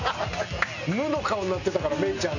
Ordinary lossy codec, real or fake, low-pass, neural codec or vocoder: none; real; 7.2 kHz; none